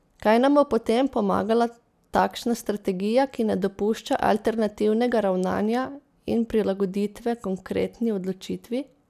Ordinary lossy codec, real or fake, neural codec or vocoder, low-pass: none; real; none; 14.4 kHz